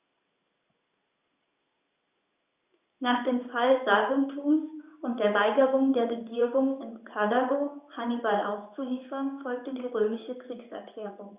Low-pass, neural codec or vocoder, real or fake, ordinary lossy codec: 3.6 kHz; codec, 16 kHz in and 24 kHz out, 1 kbps, XY-Tokenizer; fake; Opus, 64 kbps